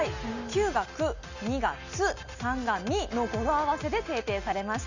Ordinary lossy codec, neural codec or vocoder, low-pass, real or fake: none; none; 7.2 kHz; real